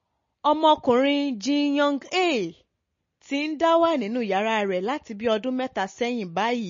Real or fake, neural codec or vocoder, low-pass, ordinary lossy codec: real; none; 7.2 kHz; MP3, 32 kbps